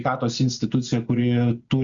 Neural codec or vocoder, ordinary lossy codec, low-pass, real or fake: none; Opus, 64 kbps; 7.2 kHz; real